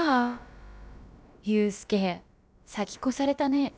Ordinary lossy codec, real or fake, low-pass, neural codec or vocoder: none; fake; none; codec, 16 kHz, about 1 kbps, DyCAST, with the encoder's durations